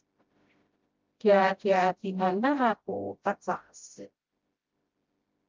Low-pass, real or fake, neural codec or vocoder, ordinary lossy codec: 7.2 kHz; fake; codec, 16 kHz, 0.5 kbps, FreqCodec, smaller model; Opus, 32 kbps